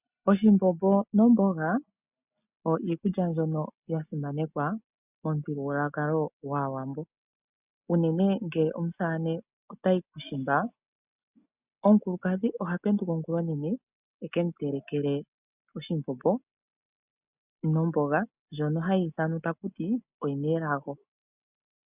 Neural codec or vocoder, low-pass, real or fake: none; 3.6 kHz; real